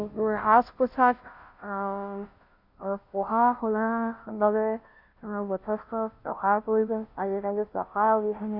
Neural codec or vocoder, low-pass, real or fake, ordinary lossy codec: codec, 16 kHz, 0.5 kbps, FunCodec, trained on Chinese and English, 25 frames a second; 5.4 kHz; fake; none